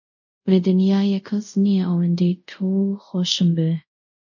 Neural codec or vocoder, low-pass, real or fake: codec, 24 kHz, 0.5 kbps, DualCodec; 7.2 kHz; fake